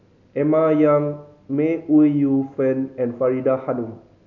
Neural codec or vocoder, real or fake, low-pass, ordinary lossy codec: none; real; 7.2 kHz; none